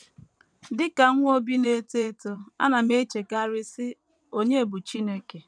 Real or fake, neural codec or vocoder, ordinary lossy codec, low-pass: fake; vocoder, 22.05 kHz, 80 mel bands, WaveNeXt; none; 9.9 kHz